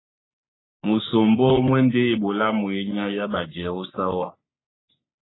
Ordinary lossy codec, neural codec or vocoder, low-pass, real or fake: AAC, 16 kbps; codec, 44.1 kHz, 3.4 kbps, Pupu-Codec; 7.2 kHz; fake